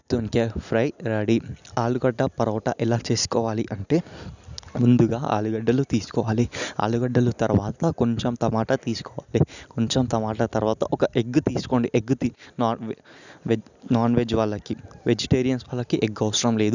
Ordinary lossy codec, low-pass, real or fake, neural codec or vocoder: none; 7.2 kHz; real; none